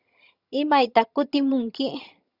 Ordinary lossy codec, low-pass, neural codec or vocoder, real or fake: Opus, 64 kbps; 5.4 kHz; vocoder, 22.05 kHz, 80 mel bands, HiFi-GAN; fake